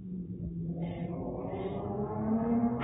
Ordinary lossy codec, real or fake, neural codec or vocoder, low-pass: AAC, 16 kbps; fake; codec, 44.1 kHz, 7.8 kbps, Pupu-Codec; 19.8 kHz